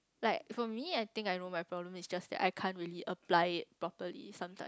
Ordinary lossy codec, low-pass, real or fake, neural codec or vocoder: none; none; real; none